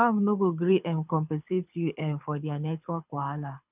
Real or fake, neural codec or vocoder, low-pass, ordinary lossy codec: fake; codec, 24 kHz, 6 kbps, HILCodec; 3.6 kHz; none